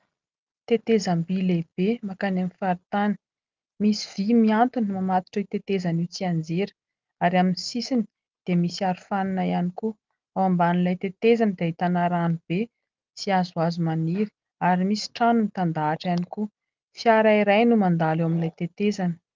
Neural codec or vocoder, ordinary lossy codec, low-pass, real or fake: none; Opus, 24 kbps; 7.2 kHz; real